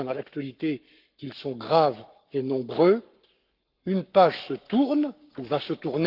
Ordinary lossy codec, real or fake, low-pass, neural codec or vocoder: Opus, 32 kbps; fake; 5.4 kHz; codec, 44.1 kHz, 7.8 kbps, Pupu-Codec